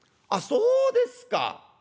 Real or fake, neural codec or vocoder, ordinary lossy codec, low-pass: real; none; none; none